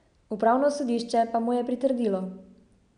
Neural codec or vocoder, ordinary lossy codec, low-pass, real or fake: none; none; 9.9 kHz; real